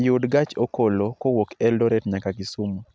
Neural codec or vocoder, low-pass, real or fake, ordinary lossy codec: none; none; real; none